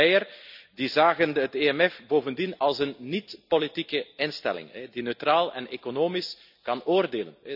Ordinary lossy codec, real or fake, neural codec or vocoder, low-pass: none; real; none; 5.4 kHz